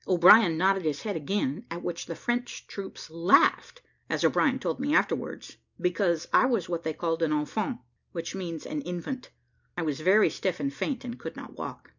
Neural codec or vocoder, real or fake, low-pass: none; real; 7.2 kHz